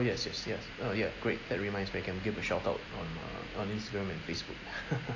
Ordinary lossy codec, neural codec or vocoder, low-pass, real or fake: AAC, 32 kbps; none; 7.2 kHz; real